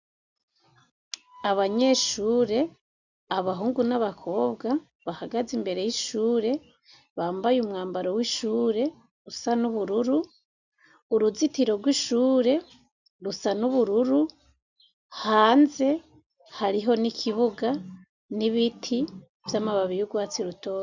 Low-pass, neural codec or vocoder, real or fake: 7.2 kHz; none; real